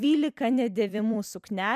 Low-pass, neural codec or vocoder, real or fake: 14.4 kHz; vocoder, 44.1 kHz, 128 mel bands every 256 samples, BigVGAN v2; fake